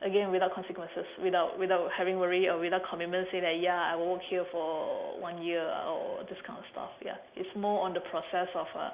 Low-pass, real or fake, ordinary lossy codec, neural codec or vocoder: 3.6 kHz; real; Opus, 64 kbps; none